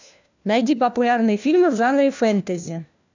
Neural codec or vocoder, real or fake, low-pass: codec, 16 kHz, 1 kbps, FunCodec, trained on LibriTTS, 50 frames a second; fake; 7.2 kHz